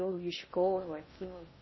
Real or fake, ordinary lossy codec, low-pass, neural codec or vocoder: fake; MP3, 24 kbps; 7.2 kHz; codec, 16 kHz in and 24 kHz out, 0.6 kbps, FocalCodec, streaming, 2048 codes